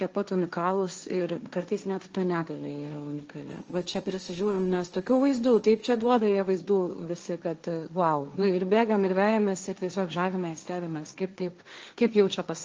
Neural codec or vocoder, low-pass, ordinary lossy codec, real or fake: codec, 16 kHz, 1.1 kbps, Voila-Tokenizer; 7.2 kHz; Opus, 24 kbps; fake